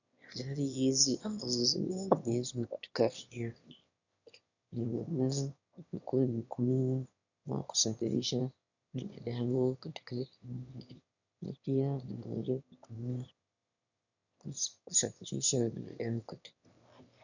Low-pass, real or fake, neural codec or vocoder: 7.2 kHz; fake; autoencoder, 22.05 kHz, a latent of 192 numbers a frame, VITS, trained on one speaker